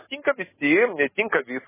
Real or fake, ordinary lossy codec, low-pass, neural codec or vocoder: real; MP3, 16 kbps; 3.6 kHz; none